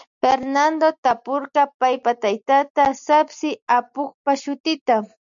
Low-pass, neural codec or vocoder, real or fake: 7.2 kHz; none; real